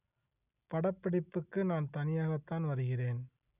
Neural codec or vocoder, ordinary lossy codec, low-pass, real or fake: none; none; 3.6 kHz; real